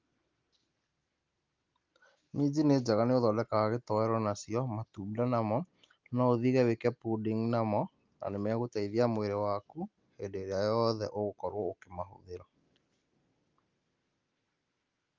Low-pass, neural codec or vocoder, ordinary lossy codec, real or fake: 7.2 kHz; none; Opus, 32 kbps; real